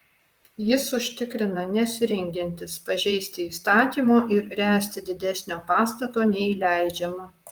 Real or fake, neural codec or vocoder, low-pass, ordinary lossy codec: fake; vocoder, 44.1 kHz, 128 mel bands, Pupu-Vocoder; 19.8 kHz; Opus, 32 kbps